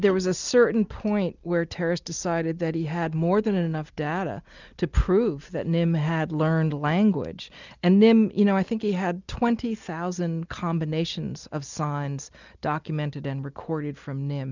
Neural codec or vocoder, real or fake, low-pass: none; real; 7.2 kHz